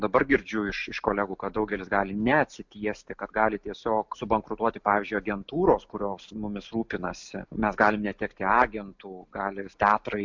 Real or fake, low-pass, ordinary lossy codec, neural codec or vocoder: real; 7.2 kHz; MP3, 64 kbps; none